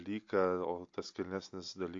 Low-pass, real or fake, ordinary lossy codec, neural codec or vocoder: 7.2 kHz; real; AAC, 48 kbps; none